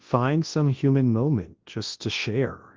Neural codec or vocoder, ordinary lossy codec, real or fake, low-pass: codec, 24 kHz, 0.9 kbps, WavTokenizer, large speech release; Opus, 16 kbps; fake; 7.2 kHz